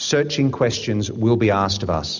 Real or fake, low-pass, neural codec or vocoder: real; 7.2 kHz; none